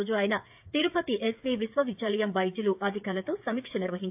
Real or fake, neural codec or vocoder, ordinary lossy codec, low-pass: fake; codec, 16 kHz, 8 kbps, FreqCodec, smaller model; AAC, 32 kbps; 3.6 kHz